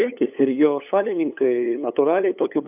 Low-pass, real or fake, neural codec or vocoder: 3.6 kHz; fake; codec, 16 kHz, 8 kbps, FunCodec, trained on LibriTTS, 25 frames a second